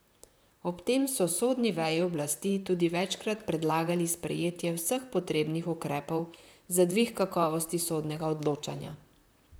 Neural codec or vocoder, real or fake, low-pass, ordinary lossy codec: vocoder, 44.1 kHz, 128 mel bands, Pupu-Vocoder; fake; none; none